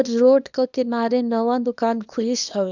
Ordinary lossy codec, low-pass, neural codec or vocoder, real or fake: none; 7.2 kHz; codec, 24 kHz, 0.9 kbps, WavTokenizer, small release; fake